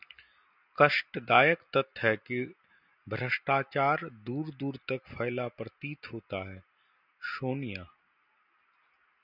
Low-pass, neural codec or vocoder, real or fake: 5.4 kHz; none; real